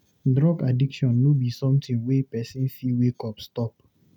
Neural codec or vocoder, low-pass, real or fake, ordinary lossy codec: none; 19.8 kHz; real; none